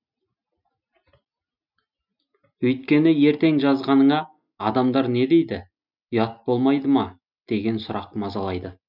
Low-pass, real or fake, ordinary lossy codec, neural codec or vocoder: 5.4 kHz; real; none; none